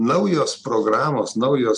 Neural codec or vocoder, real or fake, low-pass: none; real; 10.8 kHz